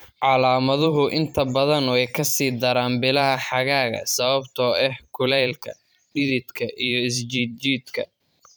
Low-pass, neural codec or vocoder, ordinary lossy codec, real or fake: none; vocoder, 44.1 kHz, 128 mel bands every 512 samples, BigVGAN v2; none; fake